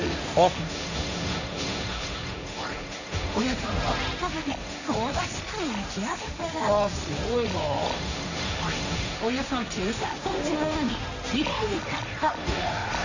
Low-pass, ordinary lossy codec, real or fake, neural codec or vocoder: 7.2 kHz; none; fake; codec, 16 kHz, 1.1 kbps, Voila-Tokenizer